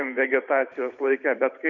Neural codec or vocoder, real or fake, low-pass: vocoder, 44.1 kHz, 128 mel bands every 512 samples, BigVGAN v2; fake; 7.2 kHz